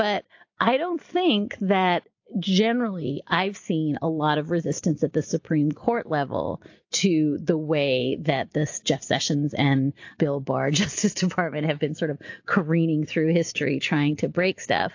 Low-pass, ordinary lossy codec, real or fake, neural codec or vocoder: 7.2 kHz; AAC, 48 kbps; real; none